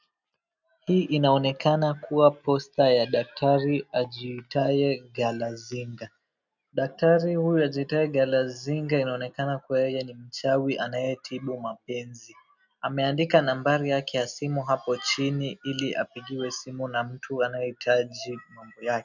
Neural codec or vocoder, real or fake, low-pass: none; real; 7.2 kHz